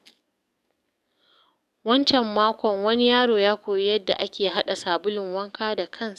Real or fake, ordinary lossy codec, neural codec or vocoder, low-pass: fake; none; codec, 44.1 kHz, 7.8 kbps, DAC; 14.4 kHz